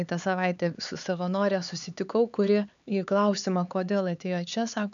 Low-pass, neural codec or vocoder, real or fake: 7.2 kHz; codec, 16 kHz, 4 kbps, X-Codec, HuBERT features, trained on LibriSpeech; fake